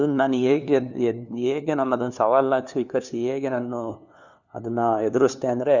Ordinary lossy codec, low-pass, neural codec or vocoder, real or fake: none; 7.2 kHz; codec, 16 kHz, 2 kbps, FunCodec, trained on LibriTTS, 25 frames a second; fake